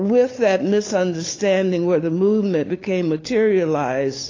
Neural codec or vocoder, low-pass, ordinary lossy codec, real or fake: codec, 16 kHz, 8 kbps, FunCodec, trained on LibriTTS, 25 frames a second; 7.2 kHz; AAC, 32 kbps; fake